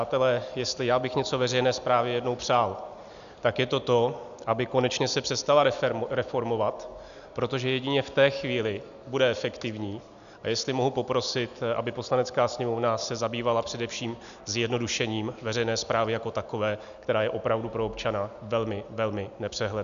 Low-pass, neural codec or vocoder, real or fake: 7.2 kHz; none; real